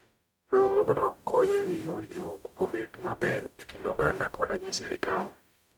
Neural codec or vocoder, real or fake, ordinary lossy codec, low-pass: codec, 44.1 kHz, 0.9 kbps, DAC; fake; none; none